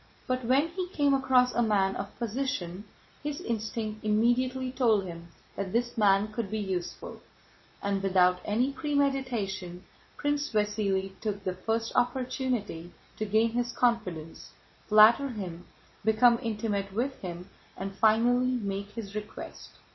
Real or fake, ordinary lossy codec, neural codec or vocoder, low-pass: real; MP3, 24 kbps; none; 7.2 kHz